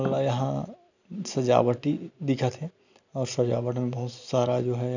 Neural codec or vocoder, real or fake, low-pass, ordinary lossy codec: none; real; 7.2 kHz; none